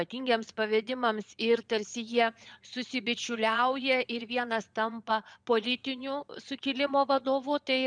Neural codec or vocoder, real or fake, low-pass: vocoder, 22.05 kHz, 80 mel bands, Vocos; fake; 9.9 kHz